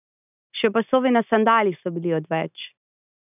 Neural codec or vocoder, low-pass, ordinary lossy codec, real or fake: none; 3.6 kHz; none; real